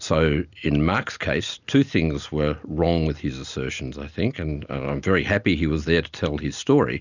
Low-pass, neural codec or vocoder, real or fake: 7.2 kHz; none; real